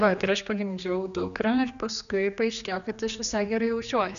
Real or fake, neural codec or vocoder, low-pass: fake; codec, 16 kHz, 2 kbps, X-Codec, HuBERT features, trained on general audio; 7.2 kHz